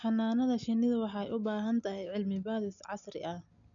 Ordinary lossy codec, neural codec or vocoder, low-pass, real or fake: none; none; 7.2 kHz; real